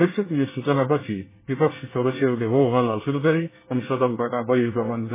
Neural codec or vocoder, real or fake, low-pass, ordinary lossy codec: codec, 24 kHz, 1 kbps, SNAC; fake; 3.6 kHz; MP3, 16 kbps